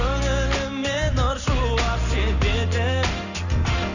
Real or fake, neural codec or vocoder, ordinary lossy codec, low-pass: real; none; none; 7.2 kHz